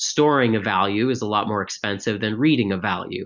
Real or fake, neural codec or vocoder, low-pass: real; none; 7.2 kHz